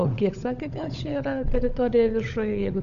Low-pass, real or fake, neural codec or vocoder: 7.2 kHz; fake; codec, 16 kHz, 16 kbps, FunCodec, trained on LibriTTS, 50 frames a second